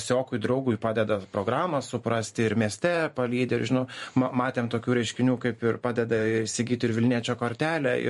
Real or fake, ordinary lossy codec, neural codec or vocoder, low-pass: fake; MP3, 48 kbps; vocoder, 44.1 kHz, 128 mel bands every 512 samples, BigVGAN v2; 14.4 kHz